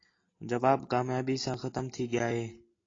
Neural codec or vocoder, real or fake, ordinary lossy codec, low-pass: none; real; AAC, 32 kbps; 7.2 kHz